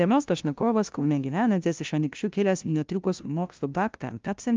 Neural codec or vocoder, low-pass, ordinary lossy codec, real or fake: codec, 16 kHz, 0.5 kbps, FunCodec, trained on LibriTTS, 25 frames a second; 7.2 kHz; Opus, 32 kbps; fake